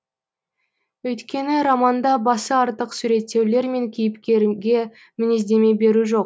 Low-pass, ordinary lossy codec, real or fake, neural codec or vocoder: none; none; real; none